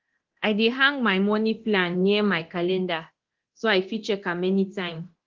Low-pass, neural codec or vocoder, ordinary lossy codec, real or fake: 7.2 kHz; codec, 24 kHz, 0.9 kbps, DualCodec; Opus, 16 kbps; fake